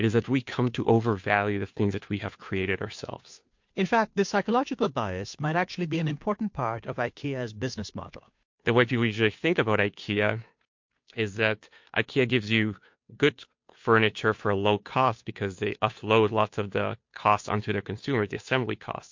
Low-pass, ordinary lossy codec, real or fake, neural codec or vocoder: 7.2 kHz; MP3, 48 kbps; fake; codec, 16 kHz, 2 kbps, FunCodec, trained on Chinese and English, 25 frames a second